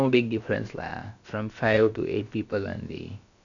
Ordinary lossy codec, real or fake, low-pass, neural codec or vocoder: none; fake; 7.2 kHz; codec, 16 kHz, about 1 kbps, DyCAST, with the encoder's durations